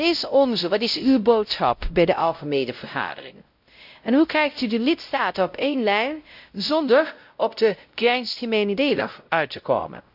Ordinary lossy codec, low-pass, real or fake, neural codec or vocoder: none; 5.4 kHz; fake; codec, 16 kHz, 0.5 kbps, X-Codec, WavLM features, trained on Multilingual LibriSpeech